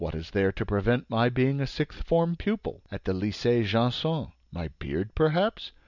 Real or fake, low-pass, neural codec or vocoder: real; 7.2 kHz; none